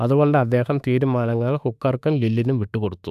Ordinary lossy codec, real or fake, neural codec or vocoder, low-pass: none; fake; autoencoder, 48 kHz, 32 numbers a frame, DAC-VAE, trained on Japanese speech; 14.4 kHz